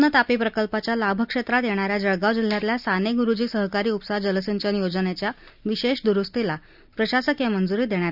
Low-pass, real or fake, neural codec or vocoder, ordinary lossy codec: 5.4 kHz; real; none; none